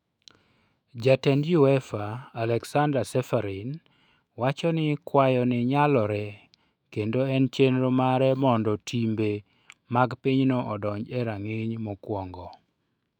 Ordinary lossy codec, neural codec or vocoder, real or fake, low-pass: none; autoencoder, 48 kHz, 128 numbers a frame, DAC-VAE, trained on Japanese speech; fake; 19.8 kHz